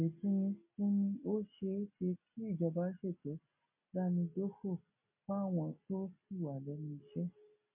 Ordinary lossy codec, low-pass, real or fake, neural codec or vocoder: MP3, 24 kbps; 3.6 kHz; real; none